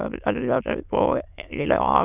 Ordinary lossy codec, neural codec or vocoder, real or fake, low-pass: none; autoencoder, 22.05 kHz, a latent of 192 numbers a frame, VITS, trained on many speakers; fake; 3.6 kHz